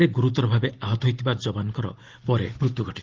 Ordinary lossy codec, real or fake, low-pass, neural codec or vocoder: Opus, 16 kbps; real; 7.2 kHz; none